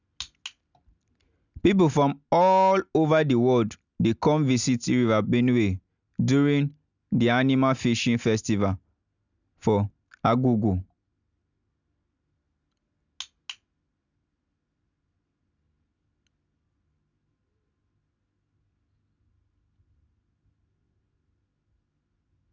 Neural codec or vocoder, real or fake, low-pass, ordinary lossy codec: none; real; 7.2 kHz; none